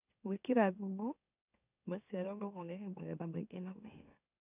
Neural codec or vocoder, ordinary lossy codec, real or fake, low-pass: autoencoder, 44.1 kHz, a latent of 192 numbers a frame, MeloTTS; none; fake; 3.6 kHz